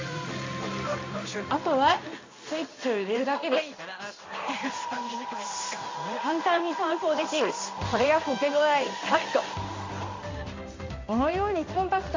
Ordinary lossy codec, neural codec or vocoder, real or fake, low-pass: MP3, 64 kbps; codec, 16 kHz in and 24 kHz out, 1 kbps, XY-Tokenizer; fake; 7.2 kHz